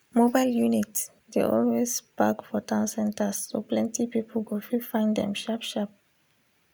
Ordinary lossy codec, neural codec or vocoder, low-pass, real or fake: none; none; none; real